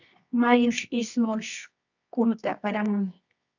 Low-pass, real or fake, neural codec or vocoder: 7.2 kHz; fake; codec, 24 kHz, 0.9 kbps, WavTokenizer, medium music audio release